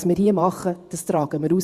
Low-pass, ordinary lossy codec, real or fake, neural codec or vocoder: 14.4 kHz; Opus, 64 kbps; fake; vocoder, 48 kHz, 128 mel bands, Vocos